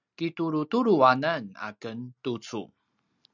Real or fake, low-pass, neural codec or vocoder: real; 7.2 kHz; none